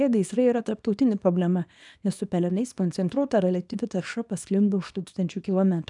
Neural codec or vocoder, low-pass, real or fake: codec, 24 kHz, 0.9 kbps, WavTokenizer, small release; 10.8 kHz; fake